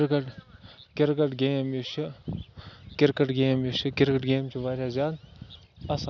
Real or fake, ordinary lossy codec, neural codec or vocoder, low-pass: real; none; none; 7.2 kHz